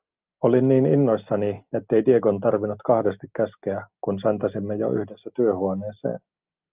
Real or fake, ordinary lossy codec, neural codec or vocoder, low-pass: real; Opus, 32 kbps; none; 3.6 kHz